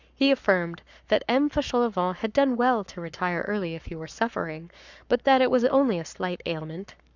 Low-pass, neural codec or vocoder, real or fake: 7.2 kHz; codec, 44.1 kHz, 7.8 kbps, Pupu-Codec; fake